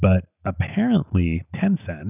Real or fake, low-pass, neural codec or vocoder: real; 3.6 kHz; none